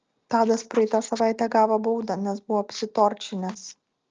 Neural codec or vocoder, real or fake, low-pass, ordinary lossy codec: none; real; 7.2 kHz; Opus, 24 kbps